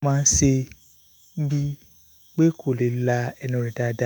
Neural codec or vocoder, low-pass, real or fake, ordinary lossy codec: autoencoder, 48 kHz, 128 numbers a frame, DAC-VAE, trained on Japanese speech; none; fake; none